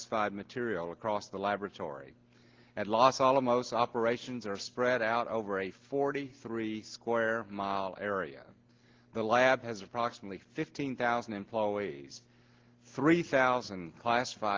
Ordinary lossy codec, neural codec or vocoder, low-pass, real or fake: Opus, 16 kbps; none; 7.2 kHz; real